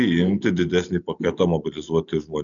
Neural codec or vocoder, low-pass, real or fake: none; 7.2 kHz; real